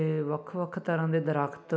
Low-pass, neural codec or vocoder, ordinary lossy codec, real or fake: none; none; none; real